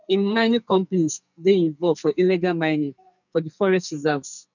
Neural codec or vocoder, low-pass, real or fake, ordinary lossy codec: codec, 44.1 kHz, 2.6 kbps, SNAC; 7.2 kHz; fake; none